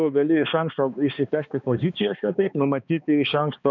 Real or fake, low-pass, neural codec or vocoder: fake; 7.2 kHz; codec, 16 kHz, 2 kbps, X-Codec, HuBERT features, trained on balanced general audio